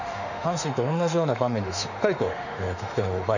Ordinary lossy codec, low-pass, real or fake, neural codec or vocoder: none; 7.2 kHz; fake; autoencoder, 48 kHz, 32 numbers a frame, DAC-VAE, trained on Japanese speech